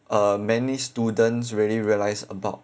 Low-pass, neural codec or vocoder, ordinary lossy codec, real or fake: none; none; none; real